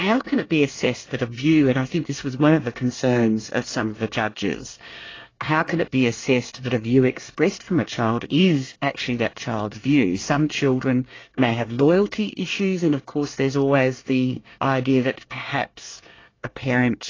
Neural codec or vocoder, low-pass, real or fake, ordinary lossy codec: codec, 24 kHz, 1 kbps, SNAC; 7.2 kHz; fake; AAC, 32 kbps